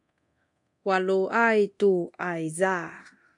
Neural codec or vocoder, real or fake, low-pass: codec, 24 kHz, 0.9 kbps, DualCodec; fake; 10.8 kHz